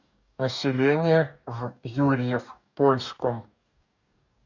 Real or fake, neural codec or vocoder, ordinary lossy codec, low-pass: fake; codec, 44.1 kHz, 2.6 kbps, DAC; none; 7.2 kHz